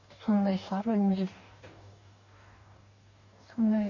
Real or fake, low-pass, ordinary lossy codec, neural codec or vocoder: fake; 7.2 kHz; none; codec, 44.1 kHz, 2.6 kbps, DAC